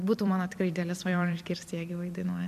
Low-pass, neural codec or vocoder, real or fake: 14.4 kHz; vocoder, 44.1 kHz, 128 mel bands every 256 samples, BigVGAN v2; fake